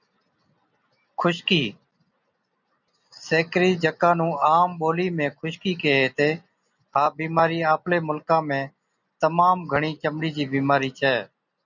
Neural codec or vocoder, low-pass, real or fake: none; 7.2 kHz; real